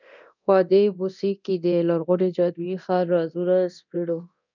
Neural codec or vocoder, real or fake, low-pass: codec, 24 kHz, 0.9 kbps, DualCodec; fake; 7.2 kHz